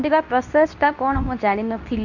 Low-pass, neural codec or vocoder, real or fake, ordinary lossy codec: 7.2 kHz; codec, 24 kHz, 0.9 kbps, WavTokenizer, medium speech release version 2; fake; none